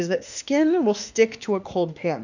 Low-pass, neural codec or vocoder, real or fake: 7.2 kHz; autoencoder, 48 kHz, 32 numbers a frame, DAC-VAE, trained on Japanese speech; fake